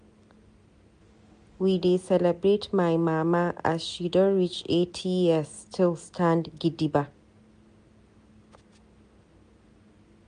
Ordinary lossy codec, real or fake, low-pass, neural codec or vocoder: MP3, 64 kbps; real; 9.9 kHz; none